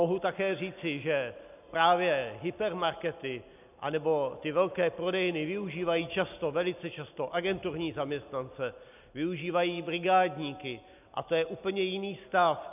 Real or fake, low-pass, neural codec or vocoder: real; 3.6 kHz; none